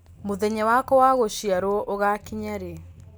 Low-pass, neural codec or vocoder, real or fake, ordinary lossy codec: none; none; real; none